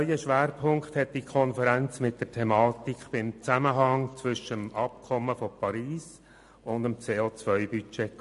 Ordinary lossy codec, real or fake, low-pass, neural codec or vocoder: MP3, 48 kbps; real; 14.4 kHz; none